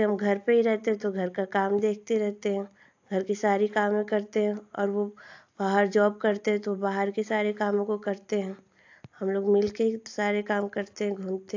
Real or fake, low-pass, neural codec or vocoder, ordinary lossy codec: real; 7.2 kHz; none; AAC, 48 kbps